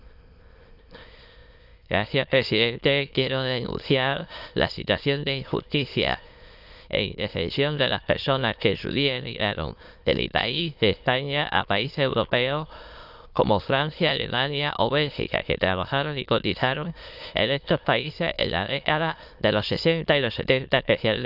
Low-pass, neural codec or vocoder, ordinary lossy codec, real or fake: 5.4 kHz; autoencoder, 22.05 kHz, a latent of 192 numbers a frame, VITS, trained on many speakers; Opus, 64 kbps; fake